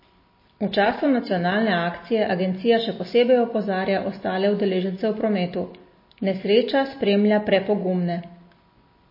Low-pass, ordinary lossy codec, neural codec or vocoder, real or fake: 5.4 kHz; MP3, 24 kbps; none; real